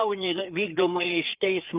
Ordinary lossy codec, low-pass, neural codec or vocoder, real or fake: Opus, 24 kbps; 3.6 kHz; codec, 16 kHz, 4 kbps, FreqCodec, larger model; fake